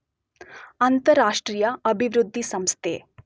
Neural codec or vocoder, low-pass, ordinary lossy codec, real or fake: none; none; none; real